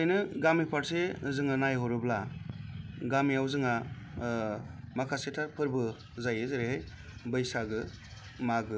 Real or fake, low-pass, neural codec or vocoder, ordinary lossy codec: real; none; none; none